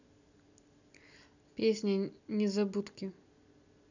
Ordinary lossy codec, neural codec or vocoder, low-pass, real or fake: none; none; 7.2 kHz; real